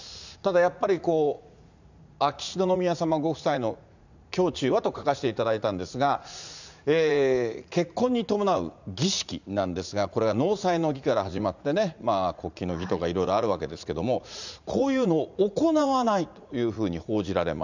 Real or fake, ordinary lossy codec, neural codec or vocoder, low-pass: fake; none; vocoder, 44.1 kHz, 80 mel bands, Vocos; 7.2 kHz